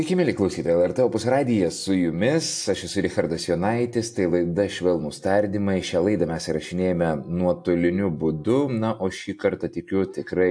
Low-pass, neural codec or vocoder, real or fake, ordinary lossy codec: 9.9 kHz; none; real; AAC, 64 kbps